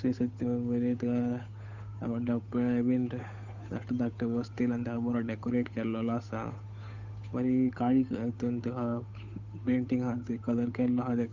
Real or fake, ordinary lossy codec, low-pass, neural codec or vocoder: fake; none; 7.2 kHz; codec, 16 kHz, 8 kbps, FunCodec, trained on Chinese and English, 25 frames a second